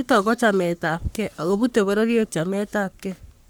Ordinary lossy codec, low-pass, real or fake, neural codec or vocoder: none; none; fake; codec, 44.1 kHz, 3.4 kbps, Pupu-Codec